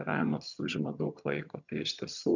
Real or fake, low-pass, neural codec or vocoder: fake; 7.2 kHz; vocoder, 22.05 kHz, 80 mel bands, HiFi-GAN